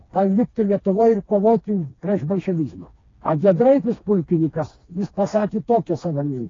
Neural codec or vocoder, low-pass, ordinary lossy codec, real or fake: codec, 16 kHz, 2 kbps, FreqCodec, smaller model; 7.2 kHz; AAC, 32 kbps; fake